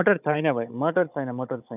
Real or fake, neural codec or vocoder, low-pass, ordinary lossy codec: fake; codec, 16 kHz, 16 kbps, FunCodec, trained on Chinese and English, 50 frames a second; 3.6 kHz; none